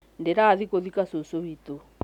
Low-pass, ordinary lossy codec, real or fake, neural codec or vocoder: 19.8 kHz; none; real; none